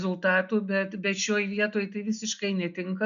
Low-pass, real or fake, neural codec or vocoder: 7.2 kHz; real; none